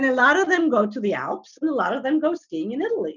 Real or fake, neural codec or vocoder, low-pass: real; none; 7.2 kHz